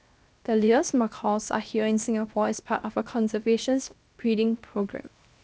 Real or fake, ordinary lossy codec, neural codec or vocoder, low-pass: fake; none; codec, 16 kHz, 0.7 kbps, FocalCodec; none